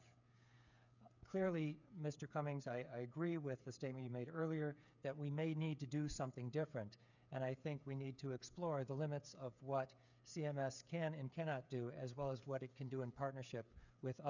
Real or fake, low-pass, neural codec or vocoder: fake; 7.2 kHz; codec, 16 kHz, 8 kbps, FreqCodec, smaller model